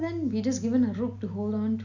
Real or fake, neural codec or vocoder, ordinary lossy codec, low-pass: real; none; none; 7.2 kHz